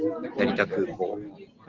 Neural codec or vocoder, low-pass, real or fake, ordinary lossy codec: none; 7.2 kHz; real; Opus, 16 kbps